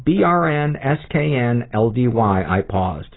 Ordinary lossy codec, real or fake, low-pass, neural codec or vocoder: AAC, 16 kbps; real; 7.2 kHz; none